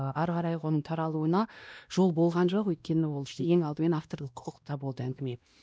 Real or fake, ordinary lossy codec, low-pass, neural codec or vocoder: fake; none; none; codec, 16 kHz, 1 kbps, X-Codec, WavLM features, trained on Multilingual LibriSpeech